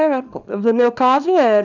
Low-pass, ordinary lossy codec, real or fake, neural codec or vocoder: 7.2 kHz; none; fake; codec, 24 kHz, 0.9 kbps, WavTokenizer, small release